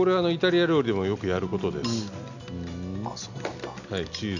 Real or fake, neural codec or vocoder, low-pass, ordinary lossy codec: real; none; 7.2 kHz; none